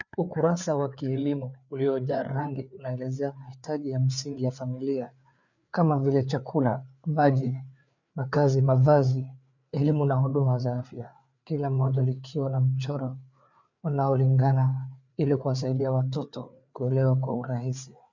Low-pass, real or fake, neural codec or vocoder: 7.2 kHz; fake; codec, 16 kHz, 4 kbps, FreqCodec, larger model